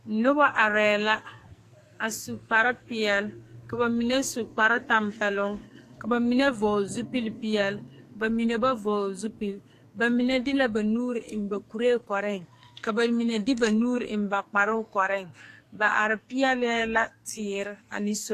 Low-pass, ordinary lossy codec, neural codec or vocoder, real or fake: 14.4 kHz; AAC, 64 kbps; codec, 44.1 kHz, 2.6 kbps, SNAC; fake